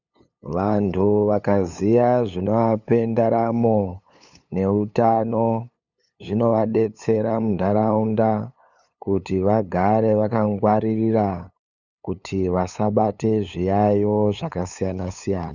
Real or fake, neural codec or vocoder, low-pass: fake; codec, 16 kHz, 8 kbps, FunCodec, trained on LibriTTS, 25 frames a second; 7.2 kHz